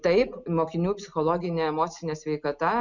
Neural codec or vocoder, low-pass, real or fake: none; 7.2 kHz; real